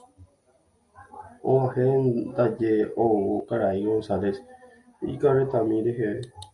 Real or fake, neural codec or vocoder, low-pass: real; none; 10.8 kHz